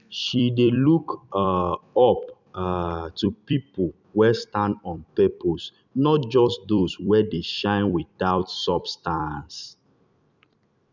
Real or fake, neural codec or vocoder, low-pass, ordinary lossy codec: fake; vocoder, 44.1 kHz, 128 mel bands every 256 samples, BigVGAN v2; 7.2 kHz; none